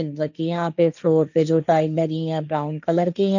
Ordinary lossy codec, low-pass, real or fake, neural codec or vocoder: none; 7.2 kHz; fake; codec, 16 kHz, 1.1 kbps, Voila-Tokenizer